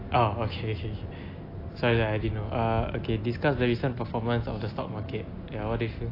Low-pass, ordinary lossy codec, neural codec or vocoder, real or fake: 5.4 kHz; AAC, 32 kbps; none; real